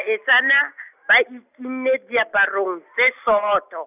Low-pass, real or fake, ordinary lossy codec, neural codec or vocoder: 3.6 kHz; real; none; none